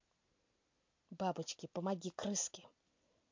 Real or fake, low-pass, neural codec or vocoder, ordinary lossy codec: real; 7.2 kHz; none; MP3, 48 kbps